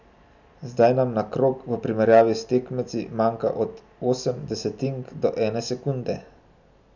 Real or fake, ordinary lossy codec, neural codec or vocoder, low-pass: real; none; none; 7.2 kHz